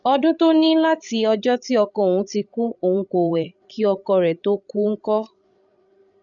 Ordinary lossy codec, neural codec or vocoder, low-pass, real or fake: none; codec, 16 kHz, 16 kbps, FreqCodec, larger model; 7.2 kHz; fake